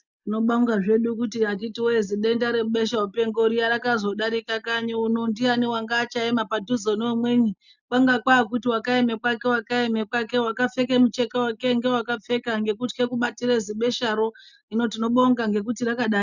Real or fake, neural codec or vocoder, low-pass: real; none; 7.2 kHz